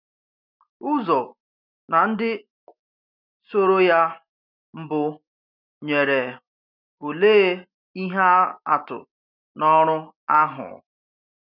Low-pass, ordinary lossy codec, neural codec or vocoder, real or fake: 5.4 kHz; none; none; real